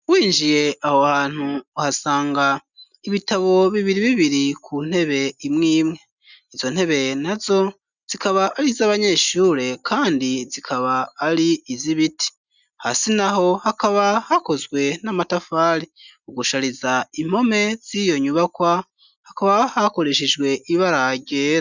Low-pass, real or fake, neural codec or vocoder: 7.2 kHz; real; none